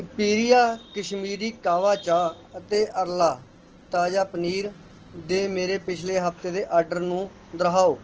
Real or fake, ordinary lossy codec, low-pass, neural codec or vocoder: real; Opus, 16 kbps; 7.2 kHz; none